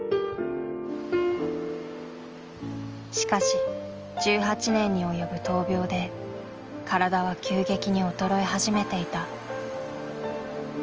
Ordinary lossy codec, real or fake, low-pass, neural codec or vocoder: Opus, 24 kbps; real; 7.2 kHz; none